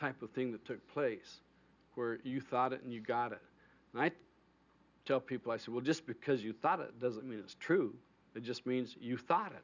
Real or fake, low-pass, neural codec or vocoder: real; 7.2 kHz; none